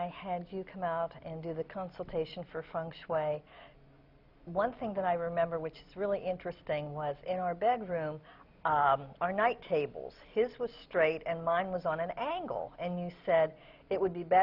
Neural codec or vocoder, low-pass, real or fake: none; 5.4 kHz; real